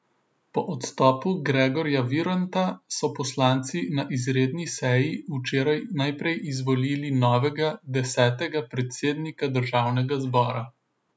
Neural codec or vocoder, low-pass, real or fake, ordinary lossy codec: none; none; real; none